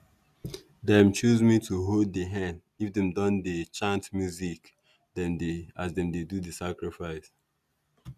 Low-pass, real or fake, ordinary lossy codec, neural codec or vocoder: 14.4 kHz; real; none; none